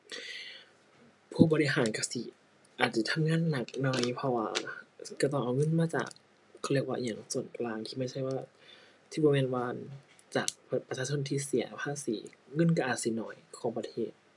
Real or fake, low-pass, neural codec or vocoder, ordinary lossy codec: real; 10.8 kHz; none; none